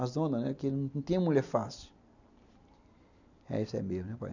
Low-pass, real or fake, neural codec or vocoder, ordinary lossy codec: 7.2 kHz; real; none; none